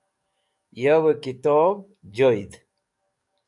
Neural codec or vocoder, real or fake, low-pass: codec, 44.1 kHz, 7.8 kbps, DAC; fake; 10.8 kHz